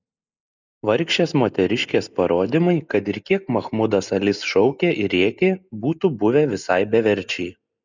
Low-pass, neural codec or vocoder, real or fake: 7.2 kHz; none; real